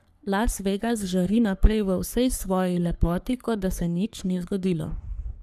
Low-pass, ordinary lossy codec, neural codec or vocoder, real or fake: 14.4 kHz; none; codec, 44.1 kHz, 3.4 kbps, Pupu-Codec; fake